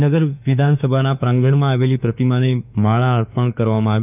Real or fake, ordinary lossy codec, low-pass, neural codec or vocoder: fake; none; 3.6 kHz; autoencoder, 48 kHz, 32 numbers a frame, DAC-VAE, trained on Japanese speech